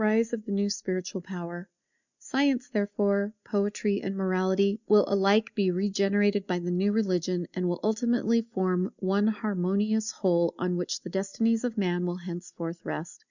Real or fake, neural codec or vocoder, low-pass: real; none; 7.2 kHz